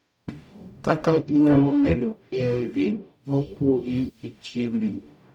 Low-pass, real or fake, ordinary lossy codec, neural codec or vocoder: 19.8 kHz; fake; none; codec, 44.1 kHz, 0.9 kbps, DAC